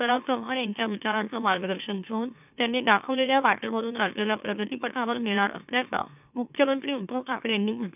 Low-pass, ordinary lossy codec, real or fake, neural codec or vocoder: 3.6 kHz; none; fake; autoencoder, 44.1 kHz, a latent of 192 numbers a frame, MeloTTS